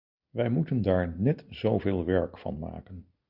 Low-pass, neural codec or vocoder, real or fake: 5.4 kHz; none; real